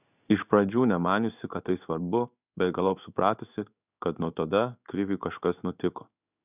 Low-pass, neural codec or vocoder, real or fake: 3.6 kHz; codec, 16 kHz in and 24 kHz out, 1 kbps, XY-Tokenizer; fake